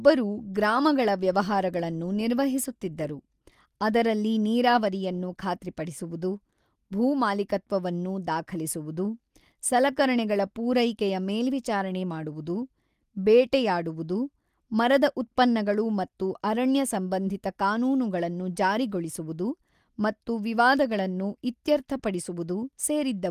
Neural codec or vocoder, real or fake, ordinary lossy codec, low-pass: none; real; Opus, 24 kbps; 14.4 kHz